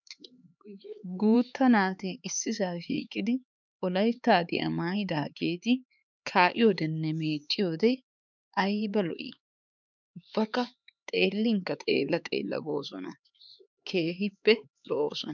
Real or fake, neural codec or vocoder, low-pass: fake; codec, 16 kHz, 4 kbps, X-Codec, HuBERT features, trained on LibriSpeech; 7.2 kHz